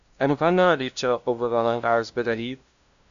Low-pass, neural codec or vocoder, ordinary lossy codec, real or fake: 7.2 kHz; codec, 16 kHz, 0.5 kbps, FunCodec, trained on LibriTTS, 25 frames a second; AAC, 96 kbps; fake